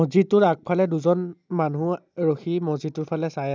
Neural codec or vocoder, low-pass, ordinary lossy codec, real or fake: none; none; none; real